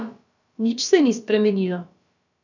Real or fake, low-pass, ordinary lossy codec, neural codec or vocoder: fake; 7.2 kHz; none; codec, 16 kHz, about 1 kbps, DyCAST, with the encoder's durations